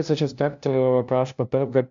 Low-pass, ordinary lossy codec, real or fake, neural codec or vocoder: 7.2 kHz; AAC, 64 kbps; fake; codec, 16 kHz, 0.5 kbps, FunCodec, trained on LibriTTS, 25 frames a second